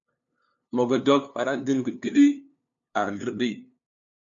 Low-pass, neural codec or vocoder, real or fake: 7.2 kHz; codec, 16 kHz, 2 kbps, FunCodec, trained on LibriTTS, 25 frames a second; fake